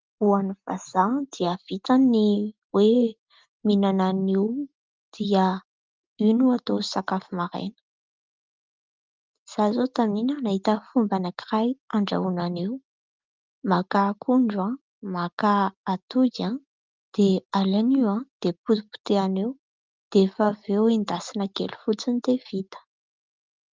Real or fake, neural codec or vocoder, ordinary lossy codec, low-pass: real; none; Opus, 32 kbps; 7.2 kHz